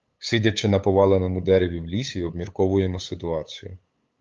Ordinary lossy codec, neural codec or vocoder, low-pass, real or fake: Opus, 32 kbps; codec, 16 kHz, 8 kbps, FunCodec, trained on LibriTTS, 25 frames a second; 7.2 kHz; fake